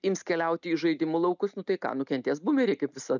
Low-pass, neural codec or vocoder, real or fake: 7.2 kHz; none; real